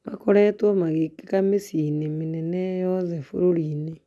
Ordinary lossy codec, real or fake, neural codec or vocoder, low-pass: none; real; none; none